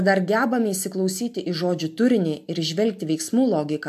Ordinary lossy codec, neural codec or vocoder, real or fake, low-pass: AAC, 96 kbps; none; real; 14.4 kHz